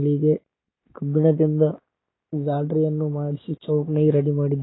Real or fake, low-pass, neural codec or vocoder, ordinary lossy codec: real; 7.2 kHz; none; AAC, 16 kbps